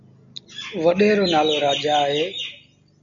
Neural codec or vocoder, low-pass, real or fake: none; 7.2 kHz; real